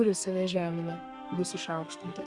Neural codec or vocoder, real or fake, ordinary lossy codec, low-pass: codec, 32 kHz, 1.9 kbps, SNAC; fake; Opus, 64 kbps; 10.8 kHz